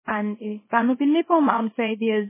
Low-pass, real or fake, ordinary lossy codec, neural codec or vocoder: 3.6 kHz; fake; MP3, 16 kbps; codec, 24 kHz, 0.9 kbps, WavTokenizer, small release